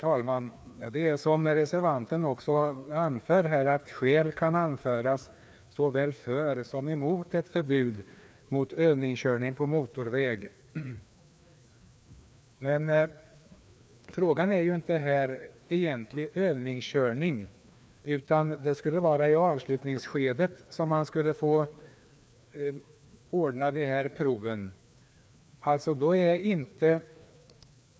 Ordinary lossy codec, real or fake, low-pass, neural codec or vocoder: none; fake; none; codec, 16 kHz, 2 kbps, FreqCodec, larger model